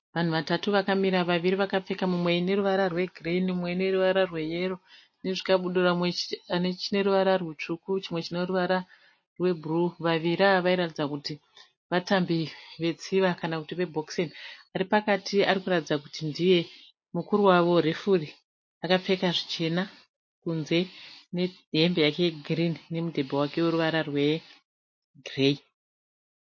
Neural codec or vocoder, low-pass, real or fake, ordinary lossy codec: none; 7.2 kHz; real; MP3, 32 kbps